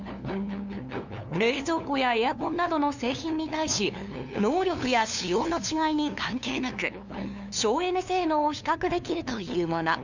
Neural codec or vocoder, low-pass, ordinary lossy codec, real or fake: codec, 16 kHz, 2 kbps, FunCodec, trained on LibriTTS, 25 frames a second; 7.2 kHz; none; fake